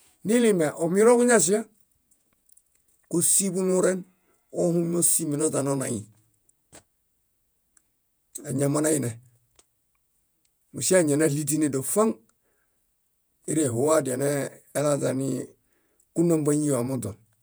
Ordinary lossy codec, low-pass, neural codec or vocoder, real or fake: none; none; vocoder, 48 kHz, 128 mel bands, Vocos; fake